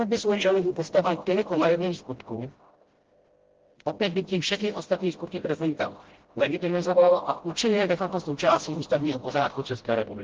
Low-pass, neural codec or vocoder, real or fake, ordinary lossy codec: 7.2 kHz; codec, 16 kHz, 0.5 kbps, FreqCodec, smaller model; fake; Opus, 16 kbps